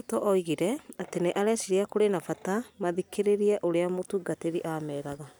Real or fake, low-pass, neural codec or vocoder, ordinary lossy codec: real; none; none; none